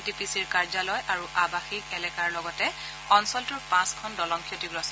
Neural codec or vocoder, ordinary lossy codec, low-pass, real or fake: none; none; none; real